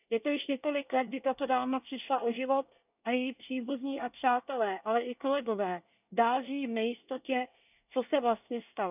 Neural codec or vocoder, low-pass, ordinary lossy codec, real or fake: codec, 24 kHz, 1 kbps, SNAC; 3.6 kHz; none; fake